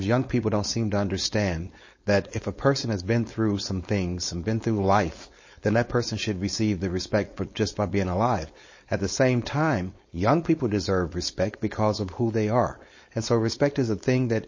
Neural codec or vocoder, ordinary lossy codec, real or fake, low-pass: codec, 16 kHz, 4.8 kbps, FACodec; MP3, 32 kbps; fake; 7.2 kHz